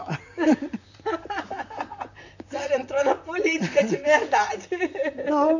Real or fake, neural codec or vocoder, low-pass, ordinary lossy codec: fake; vocoder, 44.1 kHz, 128 mel bands, Pupu-Vocoder; 7.2 kHz; none